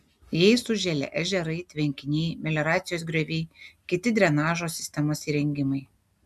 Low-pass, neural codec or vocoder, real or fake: 14.4 kHz; none; real